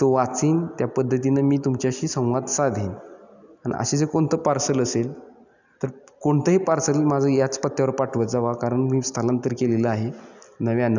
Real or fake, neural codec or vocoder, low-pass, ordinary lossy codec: real; none; 7.2 kHz; none